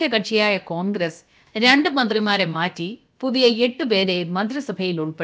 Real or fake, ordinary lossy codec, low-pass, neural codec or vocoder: fake; none; none; codec, 16 kHz, about 1 kbps, DyCAST, with the encoder's durations